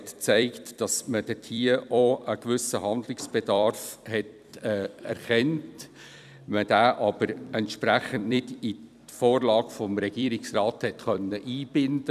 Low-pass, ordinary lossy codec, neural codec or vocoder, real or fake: 14.4 kHz; none; vocoder, 44.1 kHz, 128 mel bands every 256 samples, BigVGAN v2; fake